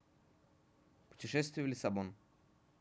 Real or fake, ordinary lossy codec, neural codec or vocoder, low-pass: real; none; none; none